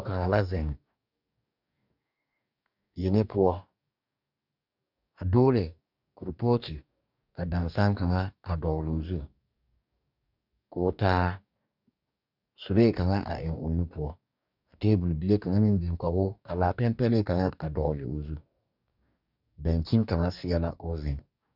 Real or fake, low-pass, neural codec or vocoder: fake; 5.4 kHz; codec, 44.1 kHz, 2.6 kbps, DAC